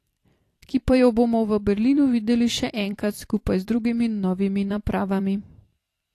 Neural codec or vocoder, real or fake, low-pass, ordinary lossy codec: vocoder, 44.1 kHz, 128 mel bands every 256 samples, BigVGAN v2; fake; 14.4 kHz; AAC, 48 kbps